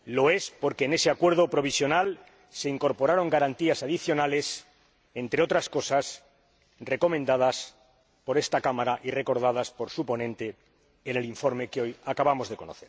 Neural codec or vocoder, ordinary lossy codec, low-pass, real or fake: none; none; none; real